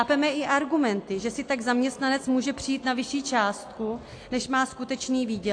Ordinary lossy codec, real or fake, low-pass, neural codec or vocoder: AAC, 48 kbps; real; 9.9 kHz; none